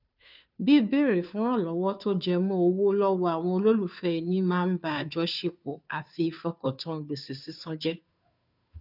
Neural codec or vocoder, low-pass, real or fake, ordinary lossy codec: codec, 16 kHz, 2 kbps, FunCodec, trained on Chinese and English, 25 frames a second; 5.4 kHz; fake; none